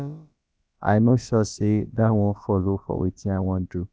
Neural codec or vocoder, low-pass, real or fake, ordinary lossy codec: codec, 16 kHz, about 1 kbps, DyCAST, with the encoder's durations; none; fake; none